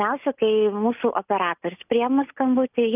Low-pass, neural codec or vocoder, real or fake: 3.6 kHz; none; real